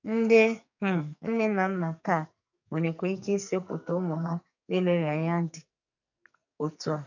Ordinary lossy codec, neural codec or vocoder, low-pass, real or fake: none; codec, 44.1 kHz, 2.6 kbps, SNAC; 7.2 kHz; fake